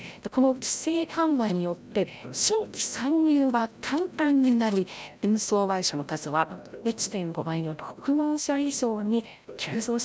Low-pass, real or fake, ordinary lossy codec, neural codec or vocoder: none; fake; none; codec, 16 kHz, 0.5 kbps, FreqCodec, larger model